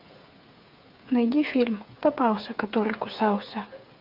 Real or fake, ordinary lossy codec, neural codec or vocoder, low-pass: fake; MP3, 48 kbps; codec, 16 kHz, 8 kbps, FreqCodec, smaller model; 5.4 kHz